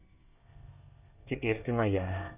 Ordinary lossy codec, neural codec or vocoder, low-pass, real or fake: none; codec, 24 kHz, 1 kbps, SNAC; 3.6 kHz; fake